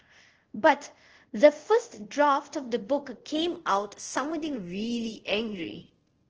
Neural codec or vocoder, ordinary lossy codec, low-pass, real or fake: codec, 24 kHz, 0.5 kbps, DualCodec; Opus, 16 kbps; 7.2 kHz; fake